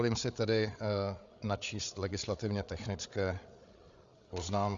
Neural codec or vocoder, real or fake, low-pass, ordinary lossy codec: codec, 16 kHz, 8 kbps, FreqCodec, larger model; fake; 7.2 kHz; Opus, 64 kbps